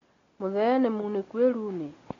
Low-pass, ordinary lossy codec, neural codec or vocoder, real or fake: 7.2 kHz; AAC, 32 kbps; none; real